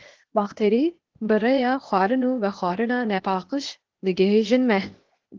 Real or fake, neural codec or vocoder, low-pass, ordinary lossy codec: fake; codec, 16 kHz, 0.8 kbps, ZipCodec; 7.2 kHz; Opus, 16 kbps